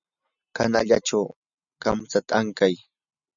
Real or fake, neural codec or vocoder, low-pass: real; none; 7.2 kHz